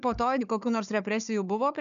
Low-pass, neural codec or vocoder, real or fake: 7.2 kHz; codec, 16 kHz, 4 kbps, FunCodec, trained on Chinese and English, 50 frames a second; fake